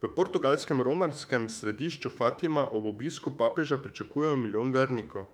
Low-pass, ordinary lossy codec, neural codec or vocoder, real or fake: 19.8 kHz; none; autoencoder, 48 kHz, 32 numbers a frame, DAC-VAE, trained on Japanese speech; fake